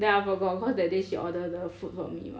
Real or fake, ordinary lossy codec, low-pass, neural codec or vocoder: real; none; none; none